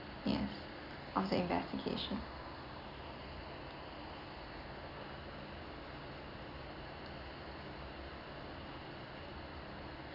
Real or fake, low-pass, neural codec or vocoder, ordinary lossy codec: real; 5.4 kHz; none; none